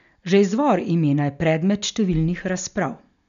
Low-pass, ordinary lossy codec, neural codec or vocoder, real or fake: 7.2 kHz; none; none; real